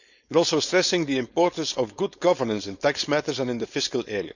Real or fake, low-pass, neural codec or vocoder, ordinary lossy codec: fake; 7.2 kHz; codec, 16 kHz, 4.8 kbps, FACodec; none